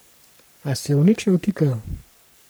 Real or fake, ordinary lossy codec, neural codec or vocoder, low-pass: fake; none; codec, 44.1 kHz, 3.4 kbps, Pupu-Codec; none